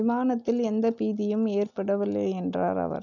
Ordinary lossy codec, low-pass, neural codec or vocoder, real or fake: none; 7.2 kHz; none; real